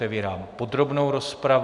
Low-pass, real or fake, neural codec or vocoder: 10.8 kHz; real; none